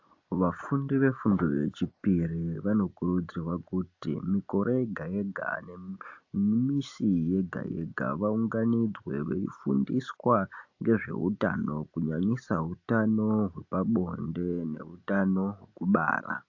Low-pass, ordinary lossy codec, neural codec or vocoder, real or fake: 7.2 kHz; AAC, 48 kbps; none; real